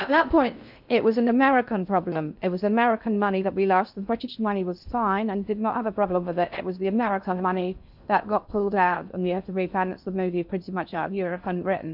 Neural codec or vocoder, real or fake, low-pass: codec, 16 kHz in and 24 kHz out, 0.6 kbps, FocalCodec, streaming, 2048 codes; fake; 5.4 kHz